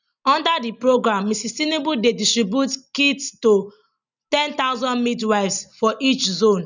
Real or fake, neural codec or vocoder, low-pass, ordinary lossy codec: real; none; 7.2 kHz; none